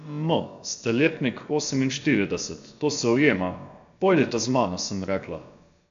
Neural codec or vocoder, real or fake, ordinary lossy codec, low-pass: codec, 16 kHz, about 1 kbps, DyCAST, with the encoder's durations; fake; AAC, 64 kbps; 7.2 kHz